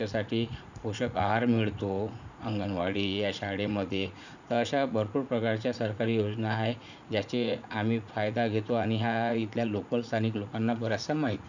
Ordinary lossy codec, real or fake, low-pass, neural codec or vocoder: none; fake; 7.2 kHz; vocoder, 44.1 kHz, 80 mel bands, Vocos